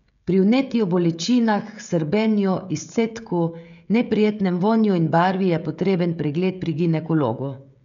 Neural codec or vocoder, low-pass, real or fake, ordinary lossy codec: codec, 16 kHz, 16 kbps, FreqCodec, smaller model; 7.2 kHz; fake; none